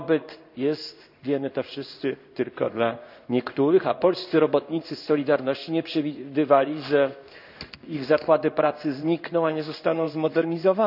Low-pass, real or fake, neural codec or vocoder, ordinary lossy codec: 5.4 kHz; fake; codec, 16 kHz in and 24 kHz out, 1 kbps, XY-Tokenizer; none